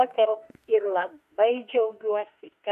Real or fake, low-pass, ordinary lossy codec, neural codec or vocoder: fake; 14.4 kHz; MP3, 64 kbps; autoencoder, 48 kHz, 32 numbers a frame, DAC-VAE, trained on Japanese speech